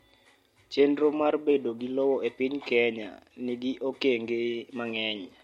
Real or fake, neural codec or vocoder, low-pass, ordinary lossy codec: real; none; 19.8 kHz; MP3, 64 kbps